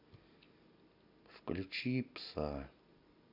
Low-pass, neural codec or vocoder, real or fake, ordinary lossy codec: 5.4 kHz; none; real; none